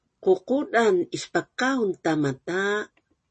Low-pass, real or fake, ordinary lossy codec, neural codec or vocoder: 10.8 kHz; real; MP3, 32 kbps; none